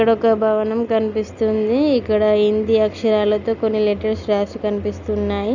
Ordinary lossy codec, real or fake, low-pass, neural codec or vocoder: none; real; 7.2 kHz; none